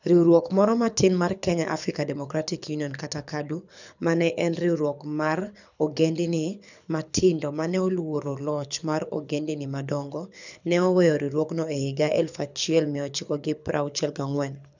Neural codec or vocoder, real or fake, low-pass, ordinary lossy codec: codec, 24 kHz, 6 kbps, HILCodec; fake; 7.2 kHz; none